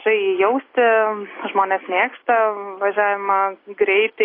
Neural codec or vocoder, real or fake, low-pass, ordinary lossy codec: none; real; 5.4 kHz; AAC, 24 kbps